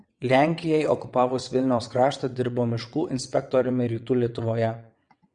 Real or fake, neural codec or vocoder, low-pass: fake; vocoder, 22.05 kHz, 80 mel bands, WaveNeXt; 9.9 kHz